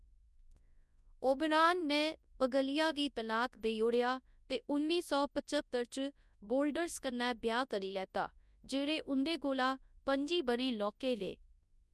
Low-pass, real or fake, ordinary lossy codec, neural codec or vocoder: none; fake; none; codec, 24 kHz, 0.9 kbps, WavTokenizer, large speech release